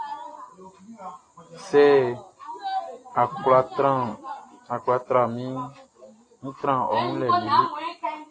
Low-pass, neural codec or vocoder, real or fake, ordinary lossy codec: 9.9 kHz; none; real; AAC, 32 kbps